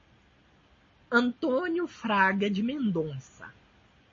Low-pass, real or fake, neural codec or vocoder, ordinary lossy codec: 7.2 kHz; real; none; MP3, 32 kbps